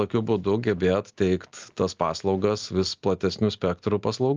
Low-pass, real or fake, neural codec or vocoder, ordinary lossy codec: 7.2 kHz; real; none; Opus, 24 kbps